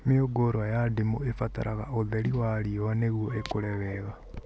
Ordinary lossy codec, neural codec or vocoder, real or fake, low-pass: none; none; real; none